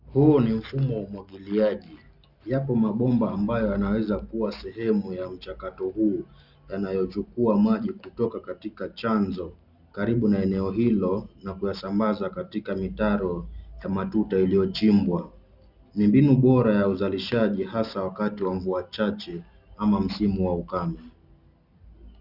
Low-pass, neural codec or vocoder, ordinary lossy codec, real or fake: 5.4 kHz; none; Opus, 64 kbps; real